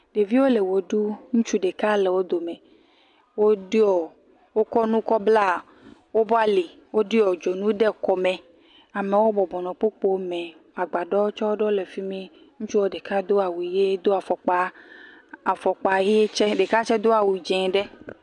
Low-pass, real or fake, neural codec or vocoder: 10.8 kHz; real; none